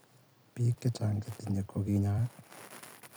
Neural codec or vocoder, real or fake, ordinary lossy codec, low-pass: vocoder, 44.1 kHz, 128 mel bands every 256 samples, BigVGAN v2; fake; none; none